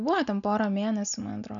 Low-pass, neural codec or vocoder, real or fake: 7.2 kHz; none; real